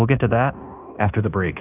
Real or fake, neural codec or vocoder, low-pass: fake; codec, 16 kHz in and 24 kHz out, 0.9 kbps, LongCat-Audio-Codec, fine tuned four codebook decoder; 3.6 kHz